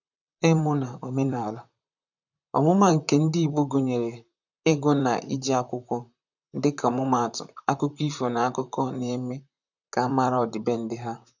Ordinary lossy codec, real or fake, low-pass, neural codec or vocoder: none; fake; 7.2 kHz; vocoder, 44.1 kHz, 128 mel bands, Pupu-Vocoder